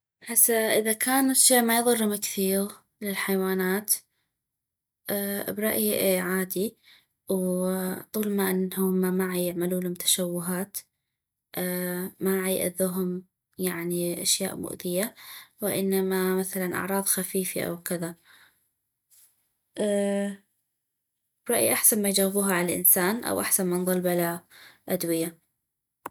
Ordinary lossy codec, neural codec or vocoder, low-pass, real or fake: none; none; none; real